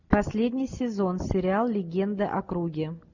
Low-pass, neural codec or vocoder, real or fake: 7.2 kHz; none; real